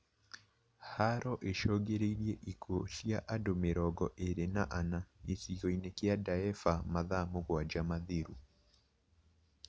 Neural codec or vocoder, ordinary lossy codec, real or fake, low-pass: none; none; real; none